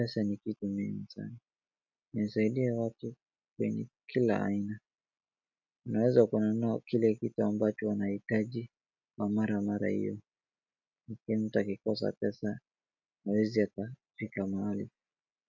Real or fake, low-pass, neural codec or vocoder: real; 7.2 kHz; none